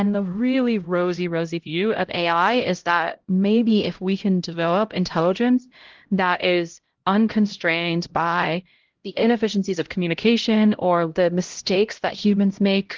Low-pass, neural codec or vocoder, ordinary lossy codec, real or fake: 7.2 kHz; codec, 16 kHz, 0.5 kbps, X-Codec, HuBERT features, trained on LibriSpeech; Opus, 16 kbps; fake